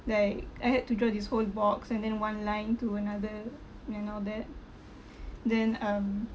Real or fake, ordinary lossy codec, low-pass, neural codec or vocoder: real; none; none; none